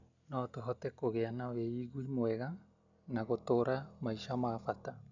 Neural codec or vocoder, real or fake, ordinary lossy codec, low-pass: none; real; none; 7.2 kHz